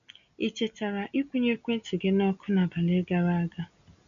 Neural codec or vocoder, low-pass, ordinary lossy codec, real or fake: none; 7.2 kHz; MP3, 96 kbps; real